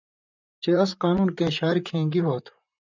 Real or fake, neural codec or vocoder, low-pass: fake; vocoder, 44.1 kHz, 128 mel bands, Pupu-Vocoder; 7.2 kHz